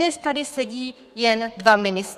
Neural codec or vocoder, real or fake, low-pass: codec, 32 kHz, 1.9 kbps, SNAC; fake; 14.4 kHz